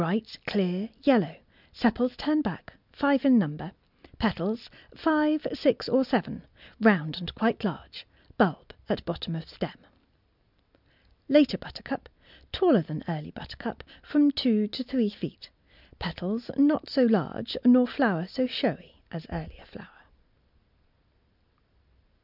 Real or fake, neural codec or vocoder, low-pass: real; none; 5.4 kHz